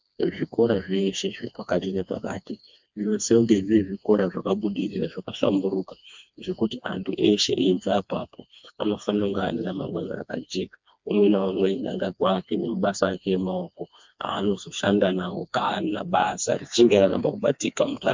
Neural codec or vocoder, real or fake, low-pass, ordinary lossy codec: codec, 16 kHz, 2 kbps, FreqCodec, smaller model; fake; 7.2 kHz; MP3, 64 kbps